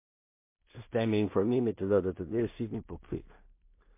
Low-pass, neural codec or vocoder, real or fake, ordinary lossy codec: 3.6 kHz; codec, 16 kHz in and 24 kHz out, 0.4 kbps, LongCat-Audio-Codec, two codebook decoder; fake; MP3, 24 kbps